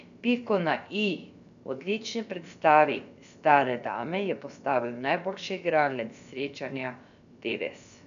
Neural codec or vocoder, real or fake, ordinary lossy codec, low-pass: codec, 16 kHz, about 1 kbps, DyCAST, with the encoder's durations; fake; none; 7.2 kHz